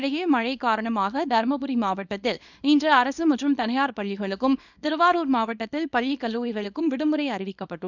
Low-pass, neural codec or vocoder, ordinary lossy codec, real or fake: 7.2 kHz; codec, 24 kHz, 0.9 kbps, WavTokenizer, small release; none; fake